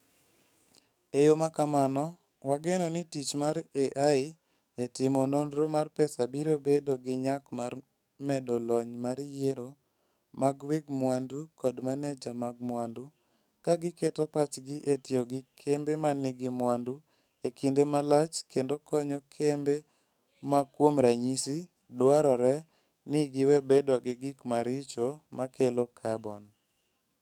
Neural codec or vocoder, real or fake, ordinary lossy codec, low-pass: codec, 44.1 kHz, 7.8 kbps, DAC; fake; none; 19.8 kHz